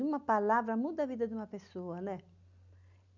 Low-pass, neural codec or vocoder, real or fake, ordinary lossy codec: 7.2 kHz; none; real; none